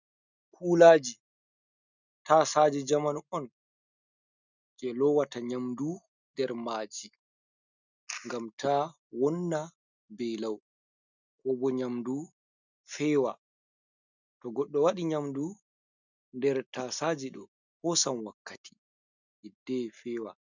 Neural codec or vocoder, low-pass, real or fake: none; 7.2 kHz; real